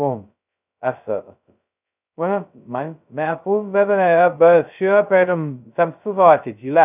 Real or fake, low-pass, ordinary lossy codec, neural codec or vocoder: fake; 3.6 kHz; none; codec, 16 kHz, 0.2 kbps, FocalCodec